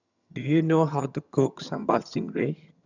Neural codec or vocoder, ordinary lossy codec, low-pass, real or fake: vocoder, 22.05 kHz, 80 mel bands, HiFi-GAN; none; 7.2 kHz; fake